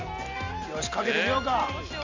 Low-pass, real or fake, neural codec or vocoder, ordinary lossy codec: 7.2 kHz; real; none; Opus, 64 kbps